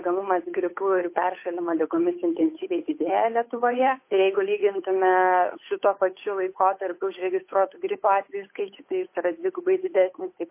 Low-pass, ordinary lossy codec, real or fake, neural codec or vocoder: 3.6 kHz; MP3, 24 kbps; fake; codec, 16 kHz, 8 kbps, FunCodec, trained on Chinese and English, 25 frames a second